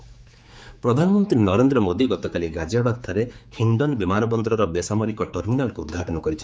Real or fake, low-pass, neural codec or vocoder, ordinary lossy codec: fake; none; codec, 16 kHz, 4 kbps, X-Codec, HuBERT features, trained on general audio; none